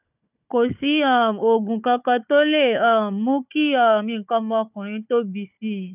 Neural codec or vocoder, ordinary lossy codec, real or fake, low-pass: codec, 16 kHz, 4 kbps, FunCodec, trained on Chinese and English, 50 frames a second; none; fake; 3.6 kHz